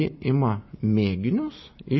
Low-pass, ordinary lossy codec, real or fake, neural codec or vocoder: 7.2 kHz; MP3, 24 kbps; real; none